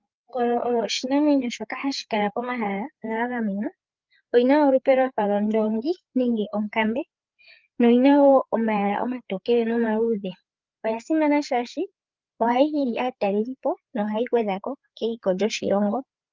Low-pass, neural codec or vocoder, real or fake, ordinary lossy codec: 7.2 kHz; codec, 16 kHz, 4 kbps, FreqCodec, larger model; fake; Opus, 32 kbps